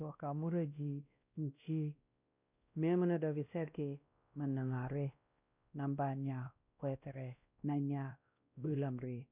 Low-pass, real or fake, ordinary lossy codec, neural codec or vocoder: 3.6 kHz; fake; none; codec, 16 kHz, 1 kbps, X-Codec, WavLM features, trained on Multilingual LibriSpeech